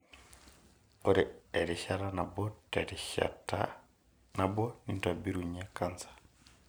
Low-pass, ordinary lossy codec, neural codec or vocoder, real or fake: none; none; none; real